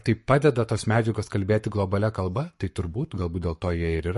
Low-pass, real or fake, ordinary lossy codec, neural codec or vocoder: 14.4 kHz; fake; MP3, 48 kbps; codec, 44.1 kHz, 7.8 kbps, Pupu-Codec